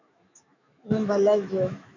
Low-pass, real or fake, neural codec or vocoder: 7.2 kHz; fake; autoencoder, 48 kHz, 128 numbers a frame, DAC-VAE, trained on Japanese speech